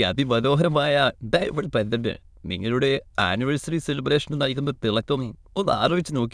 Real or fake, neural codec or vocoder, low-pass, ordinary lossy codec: fake; autoencoder, 22.05 kHz, a latent of 192 numbers a frame, VITS, trained on many speakers; none; none